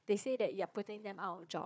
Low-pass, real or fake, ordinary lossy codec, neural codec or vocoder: none; fake; none; codec, 16 kHz, 4 kbps, FunCodec, trained on Chinese and English, 50 frames a second